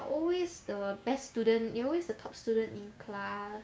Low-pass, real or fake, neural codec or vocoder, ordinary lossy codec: none; fake; codec, 16 kHz, 6 kbps, DAC; none